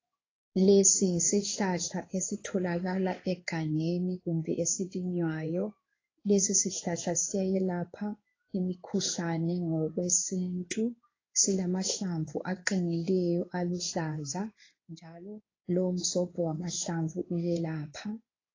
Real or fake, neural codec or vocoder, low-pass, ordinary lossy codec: fake; codec, 16 kHz in and 24 kHz out, 1 kbps, XY-Tokenizer; 7.2 kHz; AAC, 32 kbps